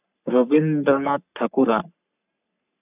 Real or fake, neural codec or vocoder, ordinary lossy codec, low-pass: fake; codec, 44.1 kHz, 3.4 kbps, Pupu-Codec; none; 3.6 kHz